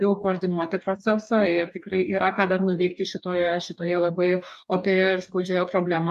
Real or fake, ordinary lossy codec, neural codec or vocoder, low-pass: fake; MP3, 96 kbps; codec, 44.1 kHz, 2.6 kbps, DAC; 14.4 kHz